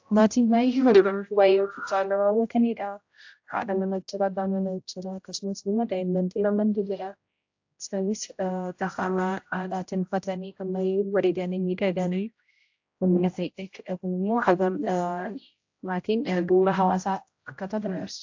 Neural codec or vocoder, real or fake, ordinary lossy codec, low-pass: codec, 16 kHz, 0.5 kbps, X-Codec, HuBERT features, trained on general audio; fake; AAC, 48 kbps; 7.2 kHz